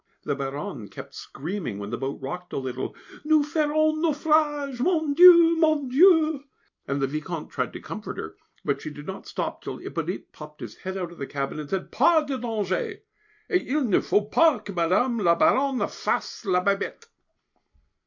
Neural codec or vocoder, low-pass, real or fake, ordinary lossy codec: none; 7.2 kHz; real; MP3, 48 kbps